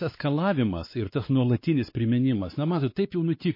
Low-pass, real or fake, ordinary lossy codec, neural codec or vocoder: 5.4 kHz; fake; MP3, 24 kbps; codec, 16 kHz, 4 kbps, X-Codec, WavLM features, trained on Multilingual LibriSpeech